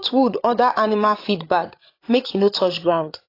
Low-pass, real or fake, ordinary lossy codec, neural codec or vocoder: 5.4 kHz; fake; AAC, 32 kbps; codec, 16 kHz, 8 kbps, FreqCodec, larger model